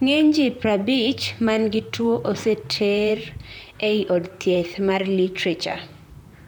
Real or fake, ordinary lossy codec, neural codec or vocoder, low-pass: fake; none; vocoder, 44.1 kHz, 128 mel bands, Pupu-Vocoder; none